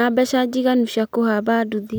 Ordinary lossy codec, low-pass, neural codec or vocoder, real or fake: none; none; none; real